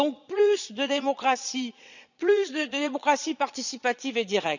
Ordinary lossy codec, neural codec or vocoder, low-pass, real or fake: none; vocoder, 22.05 kHz, 80 mel bands, Vocos; 7.2 kHz; fake